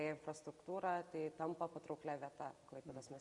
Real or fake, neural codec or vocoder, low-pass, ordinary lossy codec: real; none; 10.8 kHz; Opus, 64 kbps